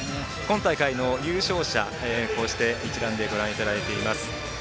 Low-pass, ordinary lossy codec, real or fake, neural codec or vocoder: none; none; real; none